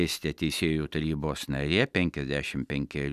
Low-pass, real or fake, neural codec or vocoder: 14.4 kHz; real; none